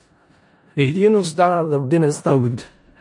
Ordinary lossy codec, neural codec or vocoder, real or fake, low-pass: MP3, 48 kbps; codec, 16 kHz in and 24 kHz out, 0.4 kbps, LongCat-Audio-Codec, four codebook decoder; fake; 10.8 kHz